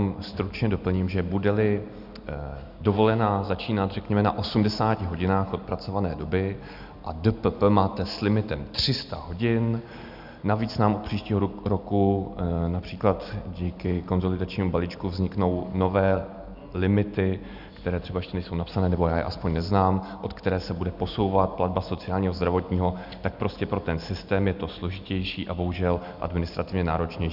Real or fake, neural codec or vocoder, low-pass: real; none; 5.4 kHz